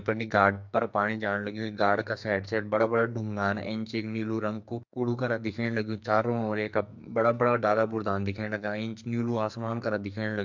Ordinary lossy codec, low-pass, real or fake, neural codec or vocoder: MP3, 64 kbps; 7.2 kHz; fake; codec, 44.1 kHz, 2.6 kbps, SNAC